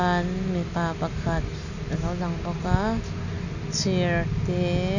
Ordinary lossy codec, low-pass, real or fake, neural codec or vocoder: none; 7.2 kHz; real; none